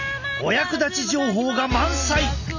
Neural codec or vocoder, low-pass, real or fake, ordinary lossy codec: none; 7.2 kHz; real; none